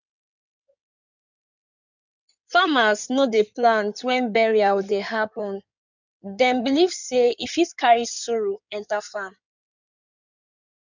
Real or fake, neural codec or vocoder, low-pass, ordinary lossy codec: fake; codec, 16 kHz in and 24 kHz out, 2.2 kbps, FireRedTTS-2 codec; 7.2 kHz; none